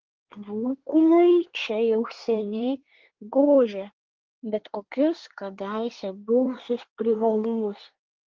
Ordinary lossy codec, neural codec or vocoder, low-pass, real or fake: Opus, 16 kbps; codec, 24 kHz, 1 kbps, SNAC; 7.2 kHz; fake